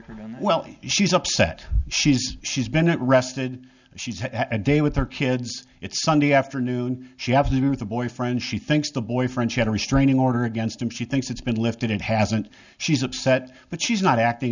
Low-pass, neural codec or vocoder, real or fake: 7.2 kHz; none; real